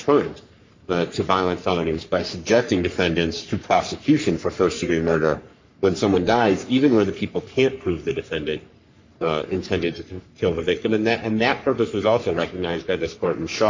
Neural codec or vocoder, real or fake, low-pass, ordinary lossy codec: codec, 44.1 kHz, 3.4 kbps, Pupu-Codec; fake; 7.2 kHz; MP3, 64 kbps